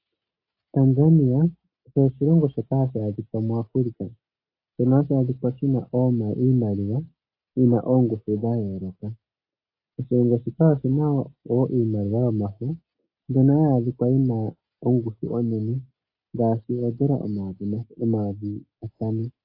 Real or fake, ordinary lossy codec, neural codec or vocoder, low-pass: real; AAC, 32 kbps; none; 5.4 kHz